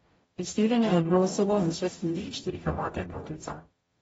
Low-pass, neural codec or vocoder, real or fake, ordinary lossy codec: 19.8 kHz; codec, 44.1 kHz, 0.9 kbps, DAC; fake; AAC, 24 kbps